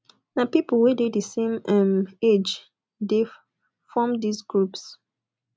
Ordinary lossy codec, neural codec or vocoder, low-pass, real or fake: none; none; none; real